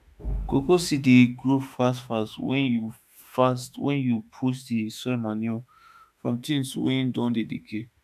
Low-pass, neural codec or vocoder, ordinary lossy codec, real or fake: 14.4 kHz; autoencoder, 48 kHz, 32 numbers a frame, DAC-VAE, trained on Japanese speech; none; fake